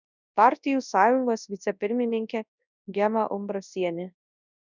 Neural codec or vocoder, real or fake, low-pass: codec, 24 kHz, 0.9 kbps, WavTokenizer, large speech release; fake; 7.2 kHz